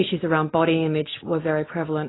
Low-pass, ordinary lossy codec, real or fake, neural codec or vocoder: 7.2 kHz; AAC, 16 kbps; real; none